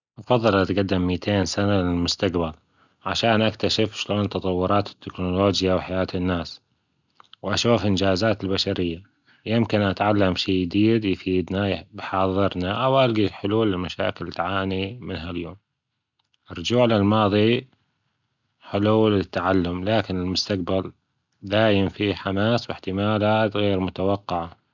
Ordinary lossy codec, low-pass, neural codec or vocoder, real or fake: none; 7.2 kHz; none; real